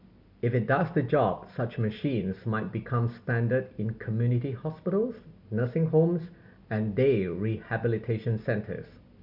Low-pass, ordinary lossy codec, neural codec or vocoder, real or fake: 5.4 kHz; none; none; real